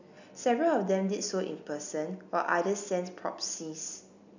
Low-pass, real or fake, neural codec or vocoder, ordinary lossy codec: 7.2 kHz; real; none; none